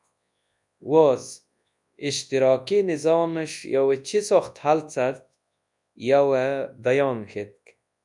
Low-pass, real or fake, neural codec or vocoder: 10.8 kHz; fake; codec, 24 kHz, 0.9 kbps, WavTokenizer, large speech release